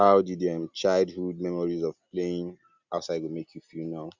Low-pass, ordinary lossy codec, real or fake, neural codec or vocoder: 7.2 kHz; Opus, 64 kbps; real; none